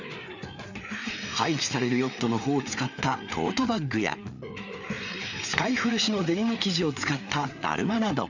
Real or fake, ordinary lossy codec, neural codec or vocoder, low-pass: fake; none; codec, 16 kHz, 4 kbps, FreqCodec, larger model; 7.2 kHz